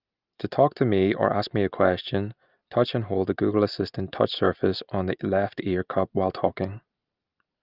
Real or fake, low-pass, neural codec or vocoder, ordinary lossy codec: real; 5.4 kHz; none; Opus, 24 kbps